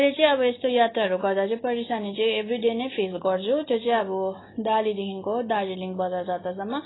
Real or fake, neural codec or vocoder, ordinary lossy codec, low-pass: real; none; AAC, 16 kbps; 7.2 kHz